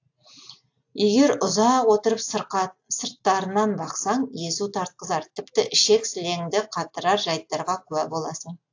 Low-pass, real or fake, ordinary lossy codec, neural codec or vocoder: 7.2 kHz; real; AAC, 48 kbps; none